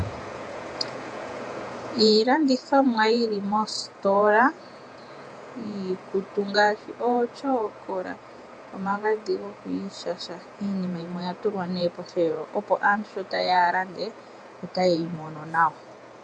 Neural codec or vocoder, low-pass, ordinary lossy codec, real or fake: vocoder, 48 kHz, 128 mel bands, Vocos; 9.9 kHz; AAC, 64 kbps; fake